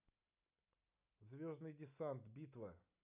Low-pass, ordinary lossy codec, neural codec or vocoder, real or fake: 3.6 kHz; none; none; real